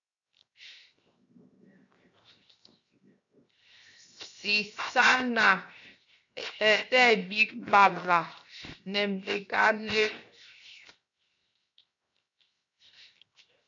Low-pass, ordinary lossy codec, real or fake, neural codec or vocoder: 7.2 kHz; AAC, 64 kbps; fake; codec, 16 kHz, 0.7 kbps, FocalCodec